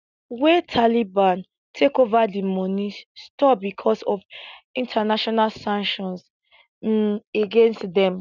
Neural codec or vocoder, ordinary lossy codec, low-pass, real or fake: none; none; 7.2 kHz; real